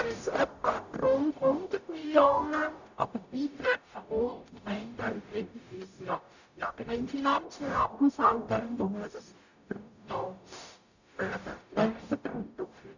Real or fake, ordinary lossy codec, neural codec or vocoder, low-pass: fake; none; codec, 44.1 kHz, 0.9 kbps, DAC; 7.2 kHz